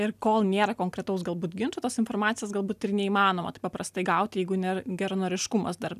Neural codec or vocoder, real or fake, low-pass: none; real; 14.4 kHz